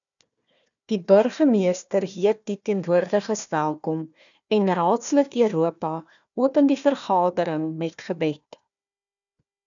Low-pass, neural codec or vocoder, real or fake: 7.2 kHz; codec, 16 kHz, 1 kbps, FunCodec, trained on Chinese and English, 50 frames a second; fake